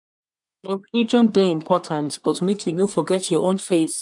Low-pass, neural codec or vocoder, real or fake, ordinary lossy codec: 10.8 kHz; codec, 24 kHz, 1 kbps, SNAC; fake; none